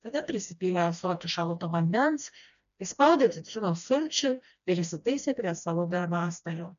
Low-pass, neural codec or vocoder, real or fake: 7.2 kHz; codec, 16 kHz, 1 kbps, FreqCodec, smaller model; fake